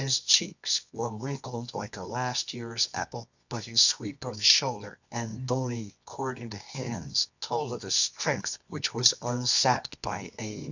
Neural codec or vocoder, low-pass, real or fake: codec, 24 kHz, 0.9 kbps, WavTokenizer, medium music audio release; 7.2 kHz; fake